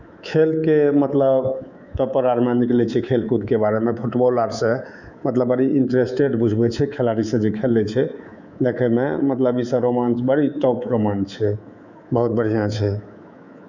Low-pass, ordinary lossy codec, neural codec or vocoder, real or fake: 7.2 kHz; none; codec, 24 kHz, 3.1 kbps, DualCodec; fake